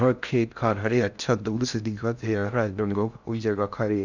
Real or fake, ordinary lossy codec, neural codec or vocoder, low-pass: fake; none; codec, 16 kHz in and 24 kHz out, 0.6 kbps, FocalCodec, streaming, 2048 codes; 7.2 kHz